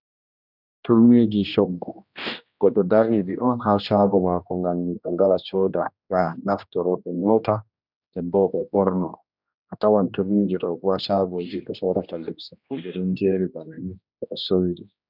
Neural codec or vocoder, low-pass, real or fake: codec, 16 kHz, 1 kbps, X-Codec, HuBERT features, trained on general audio; 5.4 kHz; fake